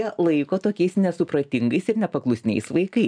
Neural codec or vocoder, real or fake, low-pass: none; real; 9.9 kHz